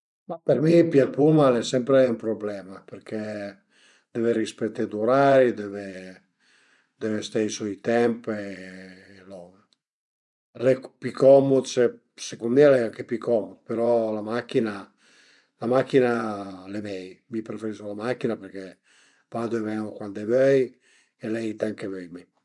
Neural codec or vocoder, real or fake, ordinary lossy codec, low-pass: vocoder, 24 kHz, 100 mel bands, Vocos; fake; none; 10.8 kHz